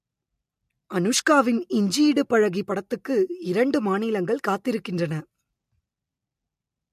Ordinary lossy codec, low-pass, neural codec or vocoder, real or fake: MP3, 64 kbps; 14.4 kHz; none; real